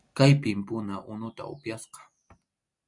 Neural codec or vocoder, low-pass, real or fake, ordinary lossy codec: none; 10.8 kHz; real; MP3, 64 kbps